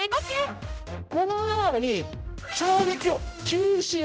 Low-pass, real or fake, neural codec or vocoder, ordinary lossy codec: none; fake; codec, 16 kHz, 0.5 kbps, X-Codec, HuBERT features, trained on general audio; none